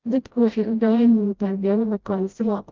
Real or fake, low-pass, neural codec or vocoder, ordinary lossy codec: fake; 7.2 kHz; codec, 16 kHz, 0.5 kbps, FreqCodec, smaller model; Opus, 24 kbps